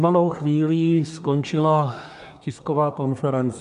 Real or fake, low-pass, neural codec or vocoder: fake; 10.8 kHz; codec, 24 kHz, 1 kbps, SNAC